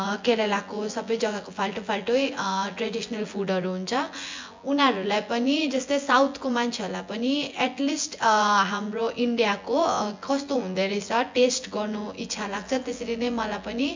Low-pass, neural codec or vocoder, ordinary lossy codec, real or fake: 7.2 kHz; vocoder, 24 kHz, 100 mel bands, Vocos; MP3, 64 kbps; fake